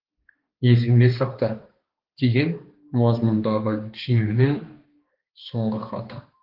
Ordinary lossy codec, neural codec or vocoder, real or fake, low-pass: Opus, 16 kbps; codec, 16 kHz, 2 kbps, X-Codec, HuBERT features, trained on balanced general audio; fake; 5.4 kHz